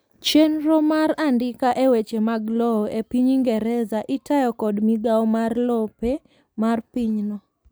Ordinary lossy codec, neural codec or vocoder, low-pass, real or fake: none; none; none; real